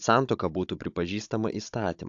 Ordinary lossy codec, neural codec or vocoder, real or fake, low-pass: AAC, 64 kbps; codec, 16 kHz, 16 kbps, FunCodec, trained on Chinese and English, 50 frames a second; fake; 7.2 kHz